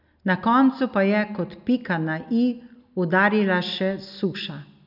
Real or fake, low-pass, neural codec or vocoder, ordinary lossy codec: real; 5.4 kHz; none; none